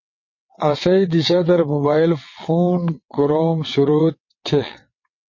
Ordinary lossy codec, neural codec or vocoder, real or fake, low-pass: MP3, 32 kbps; vocoder, 22.05 kHz, 80 mel bands, WaveNeXt; fake; 7.2 kHz